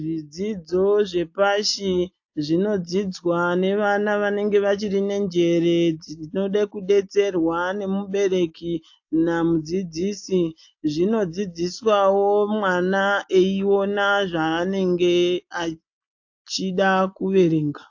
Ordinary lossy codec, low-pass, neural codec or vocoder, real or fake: AAC, 48 kbps; 7.2 kHz; none; real